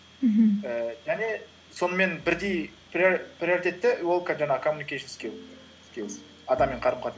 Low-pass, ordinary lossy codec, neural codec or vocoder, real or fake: none; none; none; real